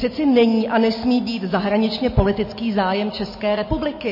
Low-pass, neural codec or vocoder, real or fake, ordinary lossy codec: 5.4 kHz; none; real; MP3, 24 kbps